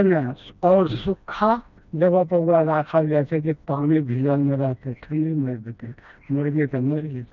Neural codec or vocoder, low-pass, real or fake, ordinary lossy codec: codec, 16 kHz, 1 kbps, FreqCodec, smaller model; 7.2 kHz; fake; none